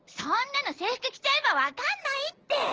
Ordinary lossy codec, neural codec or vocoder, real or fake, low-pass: Opus, 16 kbps; none; real; 7.2 kHz